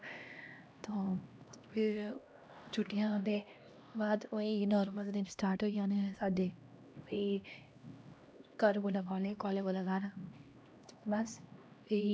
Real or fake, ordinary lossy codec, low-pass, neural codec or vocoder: fake; none; none; codec, 16 kHz, 1 kbps, X-Codec, HuBERT features, trained on LibriSpeech